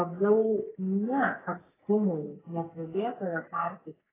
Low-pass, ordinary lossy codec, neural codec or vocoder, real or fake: 3.6 kHz; AAC, 16 kbps; codec, 44.1 kHz, 2.6 kbps, SNAC; fake